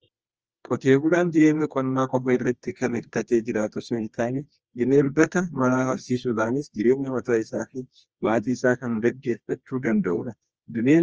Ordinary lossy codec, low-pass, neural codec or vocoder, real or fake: Opus, 24 kbps; 7.2 kHz; codec, 24 kHz, 0.9 kbps, WavTokenizer, medium music audio release; fake